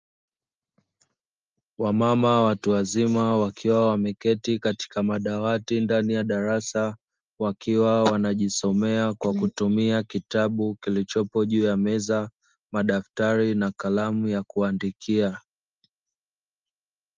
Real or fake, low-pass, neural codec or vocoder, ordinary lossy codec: real; 7.2 kHz; none; Opus, 24 kbps